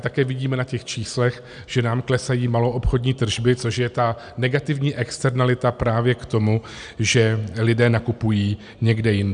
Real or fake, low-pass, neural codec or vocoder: real; 9.9 kHz; none